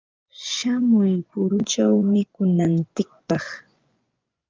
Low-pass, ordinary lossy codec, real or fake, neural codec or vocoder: 7.2 kHz; Opus, 32 kbps; fake; vocoder, 24 kHz, 100 mel bands, Vocos